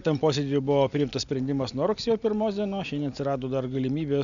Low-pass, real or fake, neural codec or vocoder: 7.2 kHz; real; none